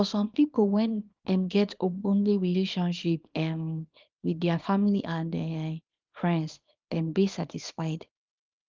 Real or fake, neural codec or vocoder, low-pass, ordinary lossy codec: fake; codec, 24 kHz, 0.9 kbps, WavTokenizer, small release; 7.2 kHz; Opus, 24 kbps